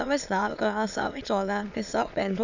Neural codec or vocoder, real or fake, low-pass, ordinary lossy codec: autoencoder, 22.05 kHz, a latent of 192 numbers a frame, VITS, trained on many speakers; fake; 7.2 kHz; none